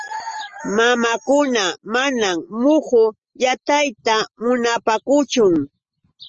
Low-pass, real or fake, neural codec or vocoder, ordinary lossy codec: 7.2 kHz; real; none; Opus, 24 kbps